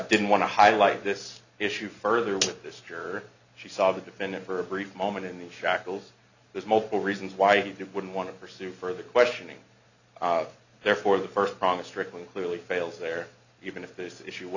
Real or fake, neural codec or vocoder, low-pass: real; none; 7.2 kHz